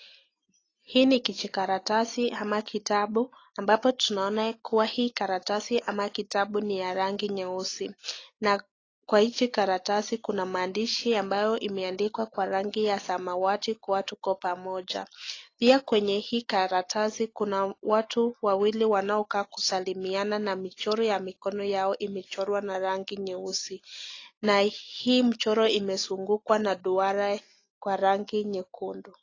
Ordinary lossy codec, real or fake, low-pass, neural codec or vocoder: AAC, 32 kbps; real; 7.2 kHz; none